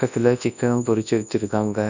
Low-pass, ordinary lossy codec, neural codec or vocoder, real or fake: 7.2 kHz; none; codec, 24 kHz, 0.9 kbps, WavTokenizer, large speech release; fake